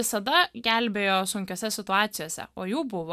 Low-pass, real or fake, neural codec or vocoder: 14.4 kHz; real; none